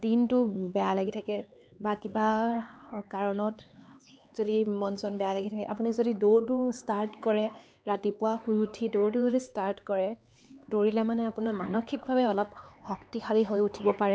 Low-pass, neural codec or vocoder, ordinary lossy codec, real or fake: none; codec, 16 kHz, 4 kbps, X-Codec, HuBERT features, trained on LibriSpeech; none; fake